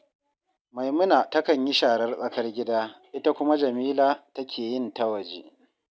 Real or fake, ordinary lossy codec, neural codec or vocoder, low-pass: real; none; none; none